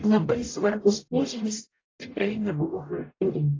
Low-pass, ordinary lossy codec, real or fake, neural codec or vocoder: 7.2 kHz; AAC, 32 kbps; fake; codec, 44.1 kHz, 0.9 kbps, DAC